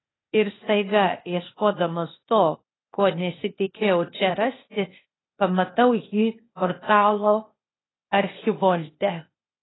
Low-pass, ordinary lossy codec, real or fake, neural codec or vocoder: 7.2 kHz; AAC, 16 kbps; fake; codec, 16 kHz, 0.8 kbps, ZipCodec